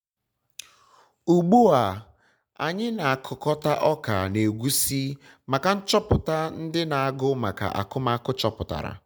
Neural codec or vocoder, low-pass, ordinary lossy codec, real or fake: none; none; none; real